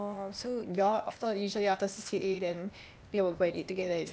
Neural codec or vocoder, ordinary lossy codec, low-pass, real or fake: codec, 16 kHz, 0.8 kbps, ZipCodec; none; none; fake